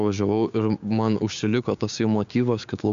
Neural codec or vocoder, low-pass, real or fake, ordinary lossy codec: codec, 16 kHz, 6 kbps, DAC; 7.2 kHz; fake; MP3, 64 kbps